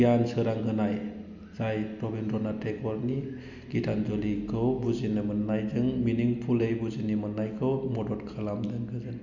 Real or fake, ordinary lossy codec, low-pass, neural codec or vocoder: real; none; 7.2 kHz; none